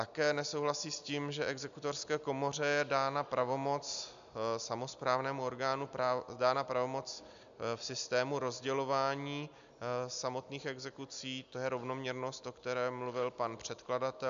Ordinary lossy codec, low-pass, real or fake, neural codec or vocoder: AAC, 96 kbps; 7.2 kHz; real; none